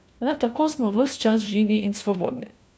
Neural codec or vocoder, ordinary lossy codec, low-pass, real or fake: codec, 16 kHz, 1 kbps, FunCodec, trained on LibriTTS, 50 frames a second; none; none; fake